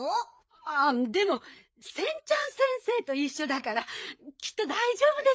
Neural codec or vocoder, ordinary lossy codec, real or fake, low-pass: codec, 16 kHz, 4 kbps, FreqCodec, larger model; none; fake; none